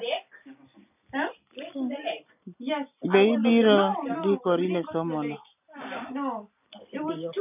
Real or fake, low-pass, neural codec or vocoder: real; 3.6 kHz; none